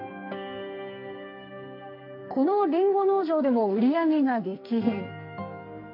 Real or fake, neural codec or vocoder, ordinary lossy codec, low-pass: fake; codec, 44.1 kHz, 2.6 kbps, SNAC; MP3, 32 kbps; 5.4 kHz